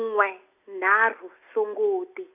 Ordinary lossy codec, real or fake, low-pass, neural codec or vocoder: none; real; 3.6 kHz; none